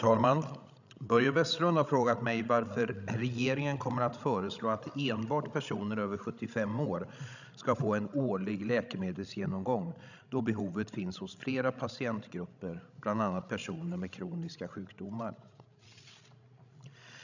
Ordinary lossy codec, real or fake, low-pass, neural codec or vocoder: none; fake; 7.2 kHz; codec, 16 kHz, 16 kbps, FreqCodec, larger model